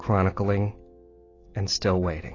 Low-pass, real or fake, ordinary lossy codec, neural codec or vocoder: 7.2 kHz; real; AAC, 32 kbps; none